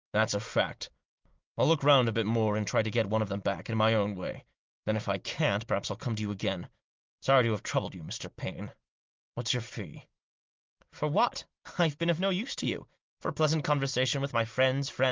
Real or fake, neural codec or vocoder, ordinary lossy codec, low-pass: real; none; Opus, 32 kbps; 7.2 kHz